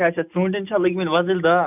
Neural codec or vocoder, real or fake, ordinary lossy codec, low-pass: none; real; none; 3.6 kHz